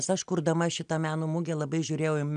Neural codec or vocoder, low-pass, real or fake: none; 9.9 kHz; real